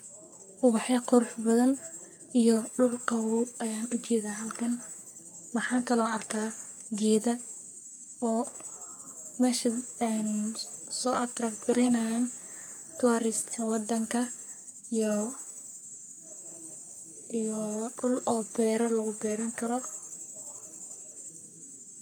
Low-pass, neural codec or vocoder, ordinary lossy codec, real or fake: none; codec, 44.1 kHz, 3.4 kbps, Pupu-Codec; none; fake